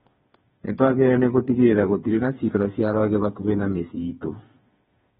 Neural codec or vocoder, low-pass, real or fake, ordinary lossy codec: codec, 16 kHz, 4 kbps, FreqCodec, smaller model; 7.2 kHz; fake; AAC, 16 kbps